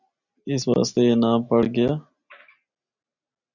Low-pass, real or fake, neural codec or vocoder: 7.2 kHz; real; none